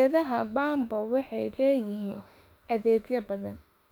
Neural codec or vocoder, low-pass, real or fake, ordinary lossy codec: autoencoder, 48 kHz, 32 numbers a frame, DAC-VAE, trained on Japanese speech; 19.8 kHz; fake; none